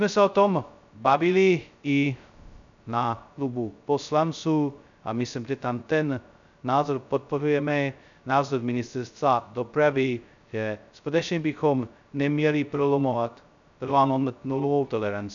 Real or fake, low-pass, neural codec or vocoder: fake; 7.2 kHz; codec, 16 kHz, 0.2 kbps, FocalCodec